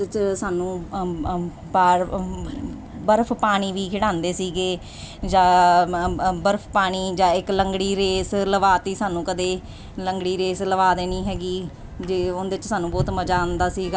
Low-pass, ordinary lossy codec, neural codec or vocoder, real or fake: none; none; none; real